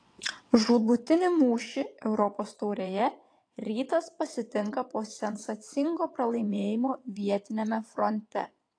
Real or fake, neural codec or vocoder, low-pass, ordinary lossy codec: fake; vocoder, 22.05 kHz, 80 mel bands, WaveNeXt; 9.9 kHz; AAC, 48 kbps